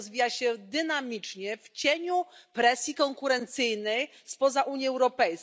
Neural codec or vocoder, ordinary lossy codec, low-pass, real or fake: none; none; none; real